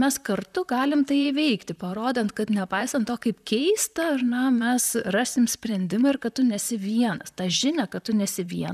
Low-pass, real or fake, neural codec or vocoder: 14.4 kHz; fake; vocoder, 44.1 kHz, 128 mel bands, Pupu-Vocoder